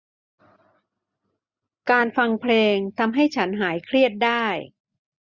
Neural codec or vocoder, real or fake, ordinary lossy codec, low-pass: none; real; Opus, 64 kbps; 7.2 kHz